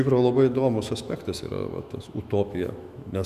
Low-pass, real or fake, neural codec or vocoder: 14.4 kHz; fake; autoencoder, 48 kHz, 128 numbers a frame, DAC-VAE, trained on Japanese speech